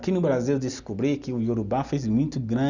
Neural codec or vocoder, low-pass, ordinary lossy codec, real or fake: none; 7.2 kHz; none; real